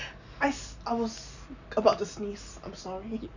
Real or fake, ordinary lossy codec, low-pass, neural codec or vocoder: real; none; 7.2 kHz; none